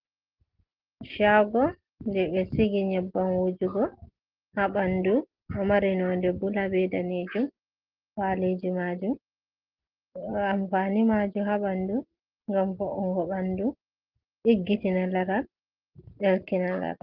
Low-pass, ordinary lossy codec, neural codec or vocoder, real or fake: 5.4 kHz; Opus, 16 kbps; none; real